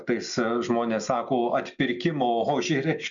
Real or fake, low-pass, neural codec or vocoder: real; 7.2 kHz; none